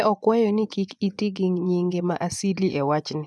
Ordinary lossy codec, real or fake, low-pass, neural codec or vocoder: none; fake; none; vocoder, 24 kHz, 100 mel bands, Vocos